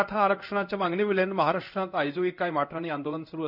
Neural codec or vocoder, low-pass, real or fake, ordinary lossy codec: codec, 16 kHz in and 24 kHz out, 1 kbps, XY-Tokenizer; 5.4 kHz; fake; none